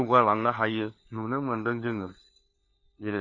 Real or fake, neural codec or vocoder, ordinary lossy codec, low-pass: fake; codec, 16 kHz, 4 kbps, FunCodec, trained on LibriTTS, 50 frames a second; MP3, 32 kbps; 7.2 kHz